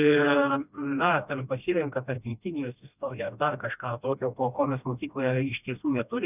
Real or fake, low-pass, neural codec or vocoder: fake; 3.6 kHz; codec, 16 kHz, 1 kbps, FreqCodec, smaller model